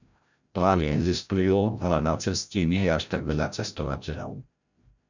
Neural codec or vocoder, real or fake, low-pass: codec, 16 kHz, 0.5 kbps, FreqCodec, larger model; fake; 7.2 kHz